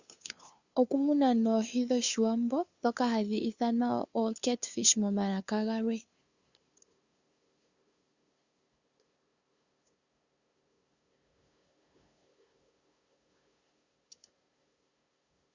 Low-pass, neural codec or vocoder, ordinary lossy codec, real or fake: 7.2 kHz; codec, 16 kHz, 2 kbps, FunCodec, trained on LibriTTS, 25 frames a second; Opus, 64 kbps; fake